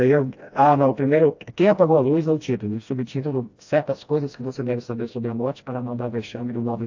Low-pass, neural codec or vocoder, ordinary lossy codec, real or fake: 7.2 kHz; codec, 16 kHz, 1 kbps, FreqCodec, smaller model; AAC, 48 kbps; fake